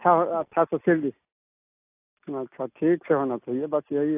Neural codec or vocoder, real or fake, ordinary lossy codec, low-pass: none; real; AAC, 24 kbps; 3.6 kHz